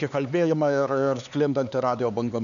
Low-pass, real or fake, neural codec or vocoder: 7.2 kHz; fake; codec, 16 kHz, 4 kbps, X-Codec, HuBERT features, trained on LibriSpeech